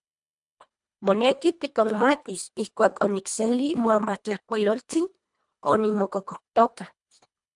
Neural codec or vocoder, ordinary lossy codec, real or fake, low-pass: codec, 24 kHz, 1.5 kbps, HILCodec; MP3, 96 kbps; fake; 10.8 kHz